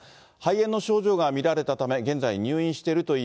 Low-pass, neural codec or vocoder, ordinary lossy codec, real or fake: none; none; none; real